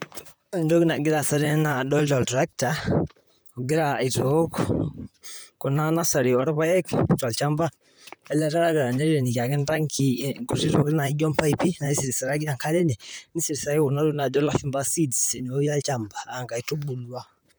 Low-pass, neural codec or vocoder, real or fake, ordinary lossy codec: none; vocoder, 44.1 kHz, 128 mel bands, Pupu-Vocoder; fake; none